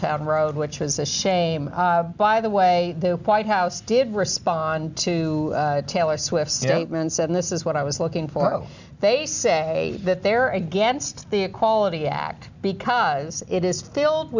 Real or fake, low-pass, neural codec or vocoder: real; 7.2 kHz; none